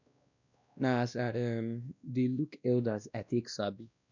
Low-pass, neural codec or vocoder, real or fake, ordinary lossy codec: 7.2 kHz; codec, 16 kHz, 1 kbps, X-Codec, WavLM features, trained on Multilingual LibriSpeech; fake; none